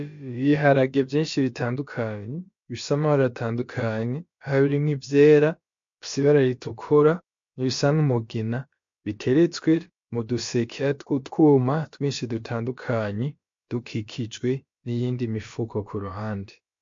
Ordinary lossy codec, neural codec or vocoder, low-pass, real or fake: MP3, 48 kbps; codec, 16 kHz, about 1 kbps, DyCAST, with the encoder's durations; 7.2 kHz; fake